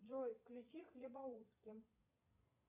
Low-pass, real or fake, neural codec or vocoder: 3.6 kHz; fake; vocoder, 22.05 kHz, 80 mel bands, Vocos